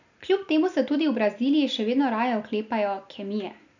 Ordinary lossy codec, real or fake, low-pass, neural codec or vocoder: none; real; 7.2 kHz; none